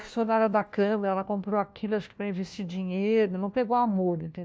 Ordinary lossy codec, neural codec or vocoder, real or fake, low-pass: none; codec, 16 kHz, 1 kbps, FunCodec, trained on LibriTTS, 50 frames a second; fake; none